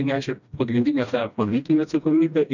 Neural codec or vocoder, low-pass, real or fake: codec, 16 kHz, 1 kbps, FreqCodec, smaller model; 7.2 kHz; fake